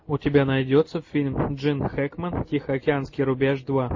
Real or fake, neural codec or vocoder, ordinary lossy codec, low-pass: real; none; MP3, 32 kbps; 7.2 kHz